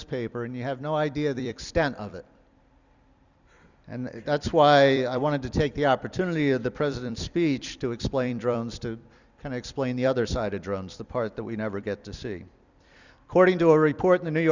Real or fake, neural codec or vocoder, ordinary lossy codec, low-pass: fake; vocoder, 44.1 kHz, 128 mel bands every 256 samples, BigVGAN v2; Opus, 64 kbps; 7.2 kHz